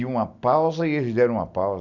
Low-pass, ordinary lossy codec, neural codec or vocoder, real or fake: 7.2 kHz; none; none; real